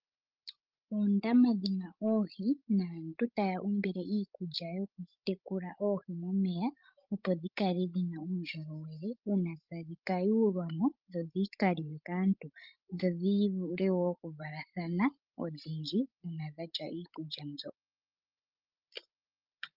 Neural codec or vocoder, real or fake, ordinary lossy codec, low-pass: codec, 16 kHz, 16 kbps, FreqCodec, larger model; fake; Opus, 32 kbps; 5.4 kHz